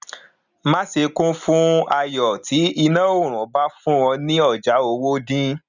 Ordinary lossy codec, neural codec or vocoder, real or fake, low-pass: none; none; real; 7.2 kHz